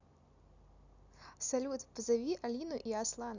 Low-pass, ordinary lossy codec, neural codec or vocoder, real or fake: 7.2 kHz; none; none; real